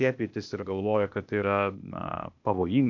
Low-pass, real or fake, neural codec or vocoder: 7.2 kHz; fake; codec, 16 kHz, 0.8 kbps, ZipCodec